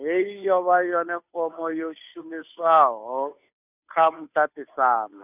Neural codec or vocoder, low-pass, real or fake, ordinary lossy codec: codec, 16 kHz, 2 kbps, FunCodec, trained on Chinese and English, 25 frames a second; 3.6 kHz; fake; none